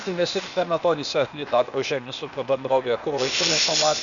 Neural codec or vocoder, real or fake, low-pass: codec, 16 kHz, 0.8 kbps, ZipCodec; fake; 7.2 kHz